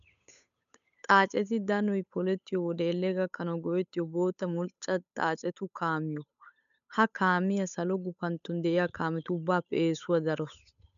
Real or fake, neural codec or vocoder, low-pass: fake; codec, 16 kHz, 8 kbps, FunCodec, trained on Chinese and English, 25 frames a second; 7.2 kHz